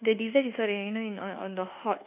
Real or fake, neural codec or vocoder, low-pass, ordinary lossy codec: fake; codec, 24 kHz, 1.2 kbps, DualCodec; 3.6 kHz; none